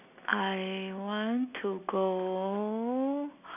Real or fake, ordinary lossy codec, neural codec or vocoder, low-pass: real; none; none; 3.6 kHz